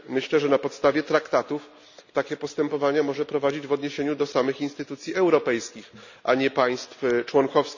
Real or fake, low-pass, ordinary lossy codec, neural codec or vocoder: real; 7.2 kHz; none; none